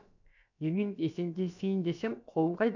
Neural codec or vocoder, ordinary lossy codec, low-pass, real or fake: codec, 16 kHz, about 1 kbps, DyCAST, with the encoder's durations; none; 7.2 kHz; fake